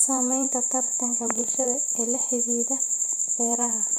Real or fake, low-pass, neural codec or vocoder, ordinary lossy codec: fake; none; vocoder, 44.1 kHz, 128 mel bands every 512 samples, BigVGAN v2; none